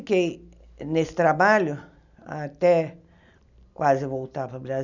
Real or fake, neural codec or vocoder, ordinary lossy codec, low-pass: real; none; none; 7.2 kHz